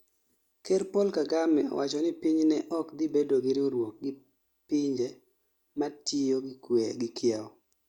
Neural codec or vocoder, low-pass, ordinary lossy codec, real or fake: none; 19.8 kHz; Opus, 64 kbps; real